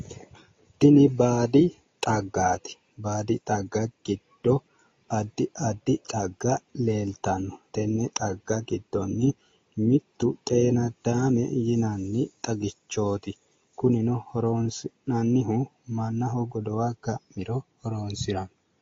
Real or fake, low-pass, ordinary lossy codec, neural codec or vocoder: real; 7.2 kHz; AAC, 32 kbps; none